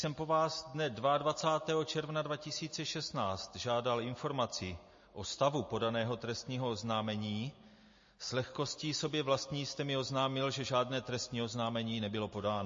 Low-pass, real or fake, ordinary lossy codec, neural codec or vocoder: 7.2 kHz; real; MP3, 32 kbps; none